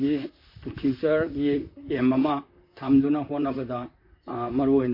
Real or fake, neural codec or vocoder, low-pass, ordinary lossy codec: fake; vocoder, 44.1 kHz, 128 mel bands, Pupu-Vocoder; 5.4 kHz; MP3, 32 kbps